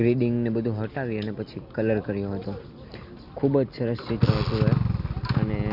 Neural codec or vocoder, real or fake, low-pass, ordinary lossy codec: none; real; 5.4 kHz; Opus, 64 kbps